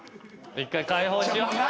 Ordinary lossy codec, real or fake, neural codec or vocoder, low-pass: none; real; none; none